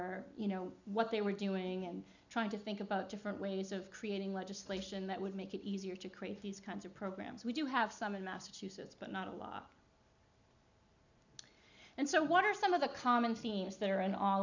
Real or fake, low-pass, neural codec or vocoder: real; 7.2 kHz; none